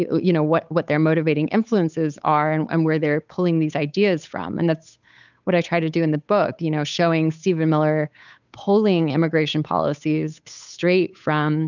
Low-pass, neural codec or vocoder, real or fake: 7.2 kHz; codec, 16 kHz, 8 kbps, FunCodec, trained on Chinese and English, 25 frames a second; fake